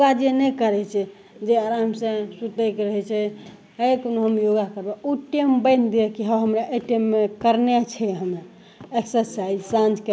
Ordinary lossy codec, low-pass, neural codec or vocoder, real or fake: none; none; none; real